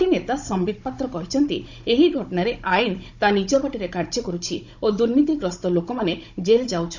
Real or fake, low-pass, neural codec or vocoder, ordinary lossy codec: fake; 7.2 kHz; codec, 16 kHz, 16 kbps, FunCodec, trained on Chinese and English, 50 frames a second; none